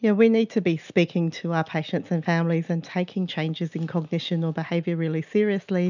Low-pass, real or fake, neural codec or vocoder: 7.2 kHz; real; none